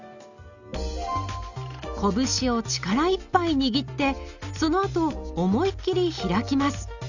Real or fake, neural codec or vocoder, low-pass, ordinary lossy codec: real; none; 7.2 kHz; none